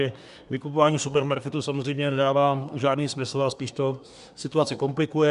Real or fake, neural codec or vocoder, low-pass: fake; codec, 24 kHz, 1 kbps, SNAC; 10.8 kHz